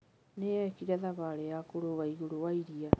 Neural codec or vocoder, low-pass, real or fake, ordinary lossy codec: none; none; real; none